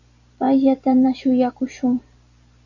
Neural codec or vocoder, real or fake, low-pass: vocoder, 44.1 kHz, 128 mel bands every 256 samples, BigVGAN v2; fake; 7.2 kHz